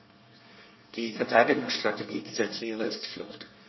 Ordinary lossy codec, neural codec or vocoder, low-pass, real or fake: MP3, 24 kbps; codec, 24 kHz, 1 kbps, SNAC; 7.2 kHz; fake